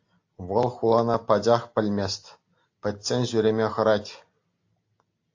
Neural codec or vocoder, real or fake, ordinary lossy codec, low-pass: none; real; AAC, 48 kbps; 7.2 kHz